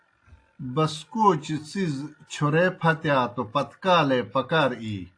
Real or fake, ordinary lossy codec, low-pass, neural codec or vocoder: real; AAC, 48 kbps; 9.9 kHz; none